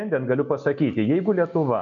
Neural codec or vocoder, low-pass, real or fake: none; 7.2 kHz; real